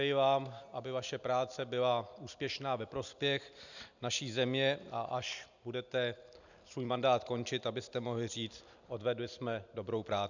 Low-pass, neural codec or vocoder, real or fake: 7.2 kHz; none; real